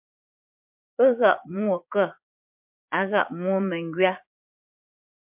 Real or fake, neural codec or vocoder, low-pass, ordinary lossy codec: fake; vocoder, 44.1 kHz, 80 mel bands, Vocos; 3.6 kHz; MP3, 32 kbps